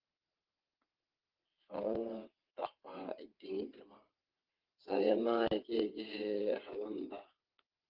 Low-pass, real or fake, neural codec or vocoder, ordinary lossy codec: 5.4 kHz; fake; vocoder, 22.05 kHz, 80 mel bands, WaveNeXt; Opus, 32 kbps